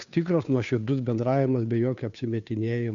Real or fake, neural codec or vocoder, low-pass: fake; codec, 16 kHz, 2 kbps, FunCodec, trained on Chinese and English, 25 frames a second; 7.2 kHz